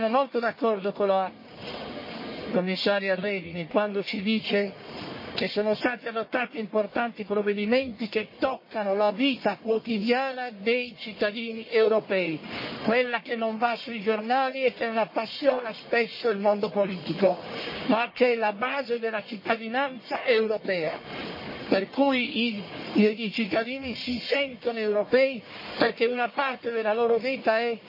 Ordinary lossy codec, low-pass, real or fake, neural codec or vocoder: MP3, 24 kbps; 5.4 kHz; fake; codec, 44.1 kHz, 1.7 kbps, Pupu-Codec